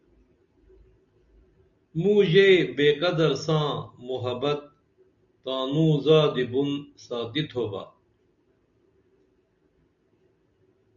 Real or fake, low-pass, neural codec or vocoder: real; 7.2 kHz; none